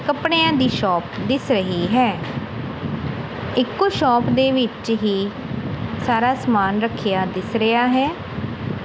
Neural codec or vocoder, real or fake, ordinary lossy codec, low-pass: none; real; none; none